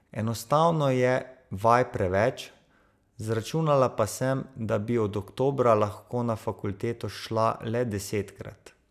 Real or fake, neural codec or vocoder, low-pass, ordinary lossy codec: real; none; 14.4 kHz; none